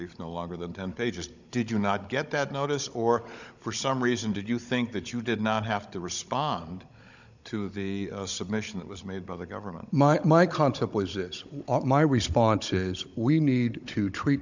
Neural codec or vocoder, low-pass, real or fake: codec, 16 kHz, 16 kbps, FunCodec, trained on Chinese and English, 50 frames a second; 7.2 kHz; fake